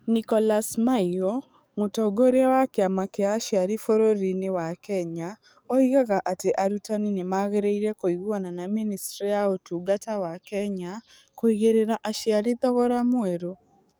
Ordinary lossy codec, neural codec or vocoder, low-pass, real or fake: none; codec, 44.1 kHz, 7.8 kbps, DAC; none; fake